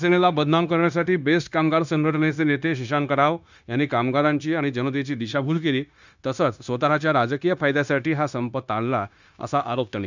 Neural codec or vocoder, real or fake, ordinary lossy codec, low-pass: codec, 16 kHz, 0.9 kbps, LongCat-Audio-Codec; fake; none; 7.2 kHz